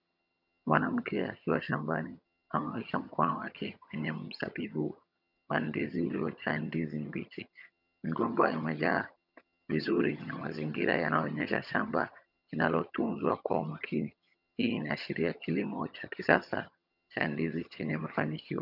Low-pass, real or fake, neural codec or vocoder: 5.4 kHz; fake; vocoder, 22.05 kHz, 80 mel bands, HiFi-GAN